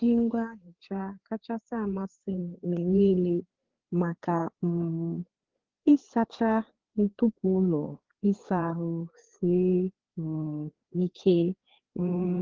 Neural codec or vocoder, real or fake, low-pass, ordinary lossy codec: codec, 16 kHz, 4 kbps, FreqCodec, larger model; fake; 7.2 kHz; Opus, 16 kbps